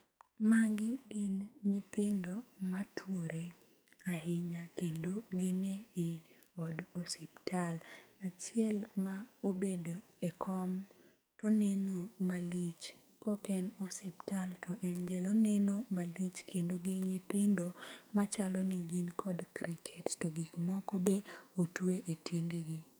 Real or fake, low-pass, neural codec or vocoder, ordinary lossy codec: fake; none; codec, 44.1 kHz, 2.6 kbps, SNAC; none